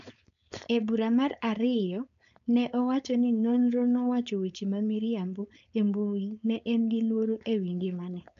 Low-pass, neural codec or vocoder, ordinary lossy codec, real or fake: 7.2 kHz; codec, 16 kHz, 4.8 kbps, FACodec; none; fake